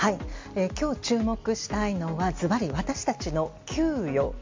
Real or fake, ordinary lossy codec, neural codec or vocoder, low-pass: real; MP3, 48 kbps; none; 7.2 kHz